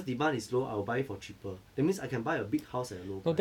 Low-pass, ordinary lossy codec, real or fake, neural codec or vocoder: 19.8 kHz; none; real; none